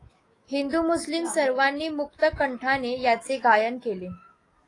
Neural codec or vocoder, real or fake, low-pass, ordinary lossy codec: autoencoder, 48 kHz, 128 numbers a frame, DAC-VAE, trained on Japanese speech; fake; 10.8 kHz; AAC, 32 kbps